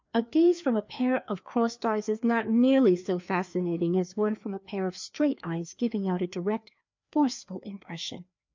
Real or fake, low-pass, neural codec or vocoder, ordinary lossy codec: fake; 7.2 kHz; codec, 16 kHz, 2 kbps, FreqCodec, larger model; MP3, 64 kbps